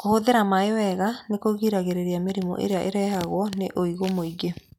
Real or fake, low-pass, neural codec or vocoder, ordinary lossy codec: real; 19.8 kHz; none; none